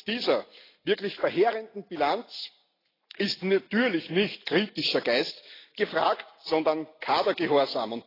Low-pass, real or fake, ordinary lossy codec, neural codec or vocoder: 5.4 kHz; real; AAC, 24 kbps; none